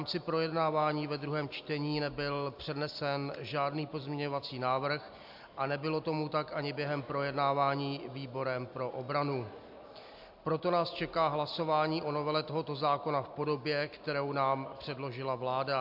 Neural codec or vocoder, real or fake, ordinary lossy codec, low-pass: none; real; MP3, 48 kbps; 5.4 kHz